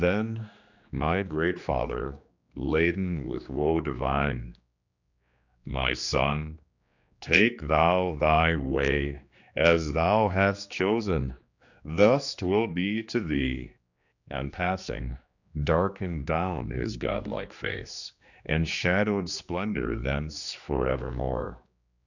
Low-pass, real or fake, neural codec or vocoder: 7.2 kHz; fake; codec, 16 kHz, 2 kbps, X-Codec, HuBERT features, trained on general audio